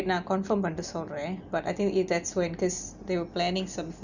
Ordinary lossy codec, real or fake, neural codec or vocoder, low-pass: none; real; none; 7.2 kHz